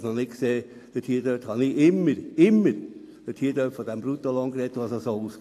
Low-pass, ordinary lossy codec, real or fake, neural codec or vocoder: 14.4 kHz; AAC, 64 kbps; real; none